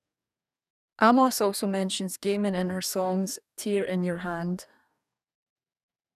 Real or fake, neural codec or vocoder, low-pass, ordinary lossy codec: fake; codec, 44.1 kHz, 2.6 kbps, DAC; 14.4 kHz; none